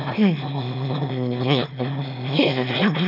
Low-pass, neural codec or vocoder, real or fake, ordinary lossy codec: 5.4 kHz; autoencoder, 22.05 kHz, a latent of 192 numbers a frame, VITS, trained on one speaker; fake; none